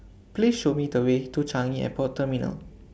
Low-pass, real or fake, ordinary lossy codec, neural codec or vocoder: none; real; none; none